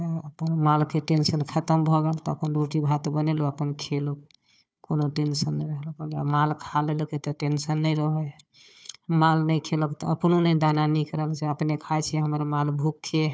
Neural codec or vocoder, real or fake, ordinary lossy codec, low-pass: codec, 16 kHz, 4 kbps, FunCodec, trained on Chinese and English, 50 frames a second; fake; none; none